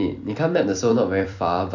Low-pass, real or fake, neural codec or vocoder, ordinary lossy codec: 7.2 kHz; fake; vocoder, 44.1 kHz, 128 mel bands every 256 samples, BigVGAN v2; AAC, 48 kbps